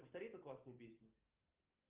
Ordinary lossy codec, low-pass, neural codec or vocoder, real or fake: Opus, 32 kbps; 3.6 kHz; none; real